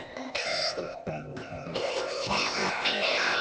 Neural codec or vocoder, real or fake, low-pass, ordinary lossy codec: codec, 16 kHz, 0.8 kbps, ZipCodec; fake; none; none